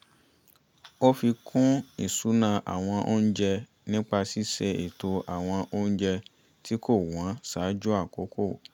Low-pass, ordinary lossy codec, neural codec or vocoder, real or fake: 19.8 kHz; none; none; real